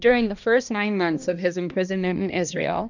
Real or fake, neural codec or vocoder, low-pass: fake; codec, 16 kHz, 1 kbps, X-Codec, HuBERT features, trained on balanced general audio; 7.2 kHz